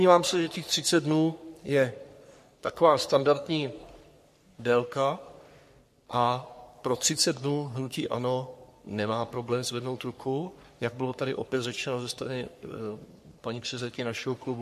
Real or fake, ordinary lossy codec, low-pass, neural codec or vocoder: fake; MP3, 64 kbps; 14.4 kHz; codec, 44.1 kHz, 3.4 kbps, Pupu-Codec